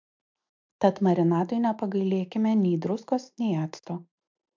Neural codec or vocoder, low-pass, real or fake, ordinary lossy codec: none; 7.2 kHz; real; MP3, 64 kbps